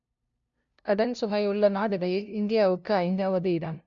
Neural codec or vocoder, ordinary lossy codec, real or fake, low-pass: codec, 16 kHz, 0.5 kbps, FunCodec, trained on LibriTTS, 25 frames a second; none; fake; 7.2 kHz